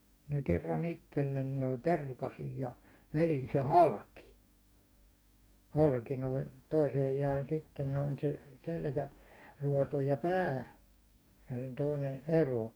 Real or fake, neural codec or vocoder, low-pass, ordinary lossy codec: fake; codec, 44.1 kHz, 2.6 kbps, DAC; none; none